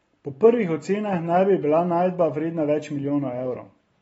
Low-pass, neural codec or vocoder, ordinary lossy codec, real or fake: 10.8 kHz; none; AAC, 24 kbps; real